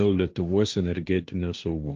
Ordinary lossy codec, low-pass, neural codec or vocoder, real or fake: Opus, 24 kbps; 7.2 kHz; codec, 16 kHz, 1.1 kbps, Voila-Tokenizer; fake